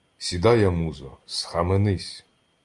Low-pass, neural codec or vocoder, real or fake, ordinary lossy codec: 10.8 kHz; none; real; Opus, 32 kbps